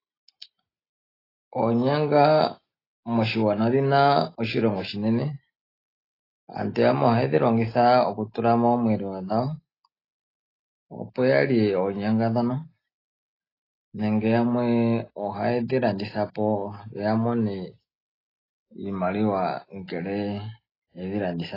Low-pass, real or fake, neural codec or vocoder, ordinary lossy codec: 5.4 kHz; real; none; AAC, 24 kbps